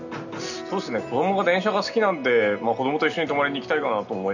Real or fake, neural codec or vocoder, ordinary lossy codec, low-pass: real; none; none; 7.2 kHz